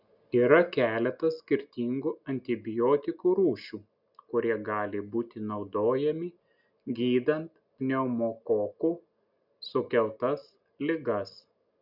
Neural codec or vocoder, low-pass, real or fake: none; 5.4 kHz; real